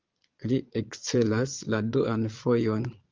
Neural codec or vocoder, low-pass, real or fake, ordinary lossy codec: vocoder, 22.05 kHz, 80 mel bands, Vocos; 7.2 kHz; fake; Opus, 32 kbps